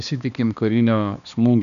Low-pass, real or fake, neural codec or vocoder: 7.2 kHz; fake; codec, 16 kHz, 2 kbps, X-Codec, HuBERT features, trained on balanced general audio